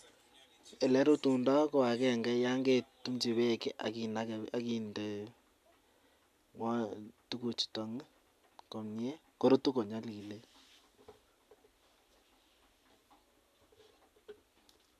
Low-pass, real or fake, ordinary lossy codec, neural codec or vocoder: 14.4 kHz; real; AAC, 96 kbps; none